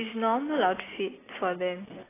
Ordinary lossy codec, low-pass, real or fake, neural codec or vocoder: AAC, 16 kbps; 3.6 kHz; real; none